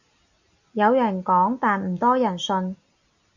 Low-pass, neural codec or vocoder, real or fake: 7.2 kHz; none; real